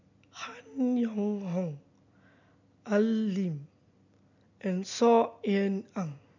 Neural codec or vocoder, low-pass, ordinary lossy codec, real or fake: none; 7.2 kHz; none; real